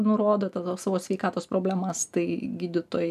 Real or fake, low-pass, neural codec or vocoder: real; 14.4 kHz; none